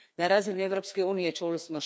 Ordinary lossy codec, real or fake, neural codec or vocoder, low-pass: none; fake; codec, 16 kHz, 2 kbps, FreqCodec, larger model; none